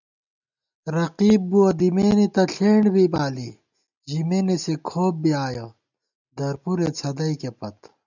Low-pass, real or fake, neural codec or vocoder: 7.2 kHz; real; none